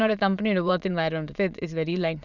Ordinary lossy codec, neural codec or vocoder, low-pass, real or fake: none; autoencoder, 22.05 kHz, a latent of 192 numbers a frame, VITS, trained on many speakers; 7.2 kHz; fake